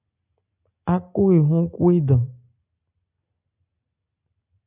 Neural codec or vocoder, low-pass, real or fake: none; 3.6 kHz; real